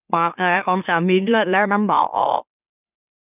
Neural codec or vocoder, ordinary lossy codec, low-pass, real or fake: autoencoder, 44.1 kHz, a latent of 192 numbers a frame, MeloTTS; none; 3.6 kHz; fake